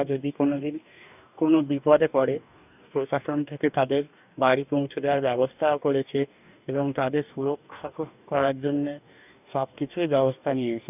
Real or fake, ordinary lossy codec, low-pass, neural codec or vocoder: fake; none; 3.6 kHz; codec, 44.1 kHz, 2.6 kbps, DAC